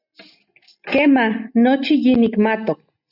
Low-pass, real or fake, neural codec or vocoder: 5.4 kHz; real; none